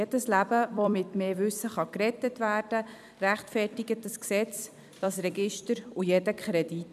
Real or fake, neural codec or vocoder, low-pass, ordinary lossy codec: fake; vocoder, 44.1 kHz, 128 mel bands every 256 samples, BigVGAN v2; 14.4 kHz; none